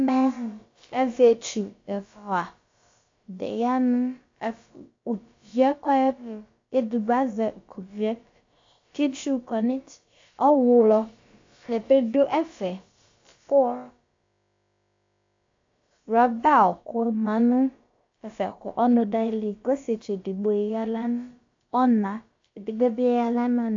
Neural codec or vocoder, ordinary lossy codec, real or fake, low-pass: codec, 16 kHz, about 1 kbps, DyCAST, with the encoder's durations; AAC, 48 kbps; fake; 7.2 kHz